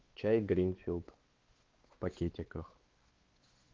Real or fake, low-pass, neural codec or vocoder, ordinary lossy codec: fake; 7.2 kHz; codec, 16 kHz, 4 kbps, X-Codec, WavLM features, trained on Multilingual LibriSpeech; Opus, 16 kbps